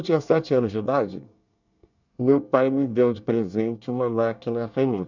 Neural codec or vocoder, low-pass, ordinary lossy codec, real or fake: codec, 24 kHz, 1 kbps, SNAC; 7.2 kHz; none; fake